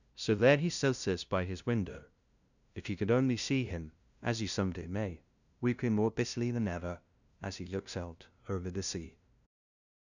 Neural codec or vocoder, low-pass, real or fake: codec, 16 kHz, 0.5 kbps, FunCodec, trained on LibriTTS, 25 frames a second; 7.2 kHz; fake